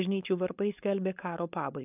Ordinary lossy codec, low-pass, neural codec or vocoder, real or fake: AAC, 32 kbps; 3.6 kHz; codec, 16 kHz, 4.8 kbps, FACodec; fake